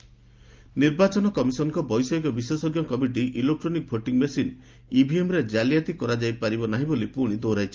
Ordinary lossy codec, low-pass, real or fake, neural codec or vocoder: Opus, 24 kbps; 7.2 kHz; real; none